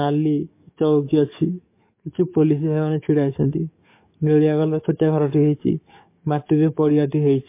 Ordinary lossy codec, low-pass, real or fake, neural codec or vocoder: MP3, 24 kbps; 3.6 kHz; fake; codec, 44.1 kHz, 7.8 kbps, DAC